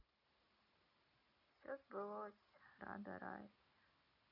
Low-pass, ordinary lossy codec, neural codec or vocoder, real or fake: 5.4 kHz; none; none; real